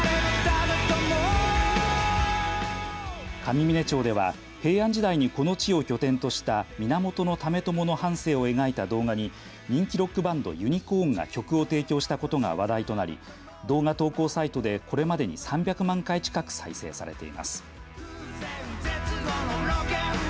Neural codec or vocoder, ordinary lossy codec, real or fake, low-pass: none; none; real; none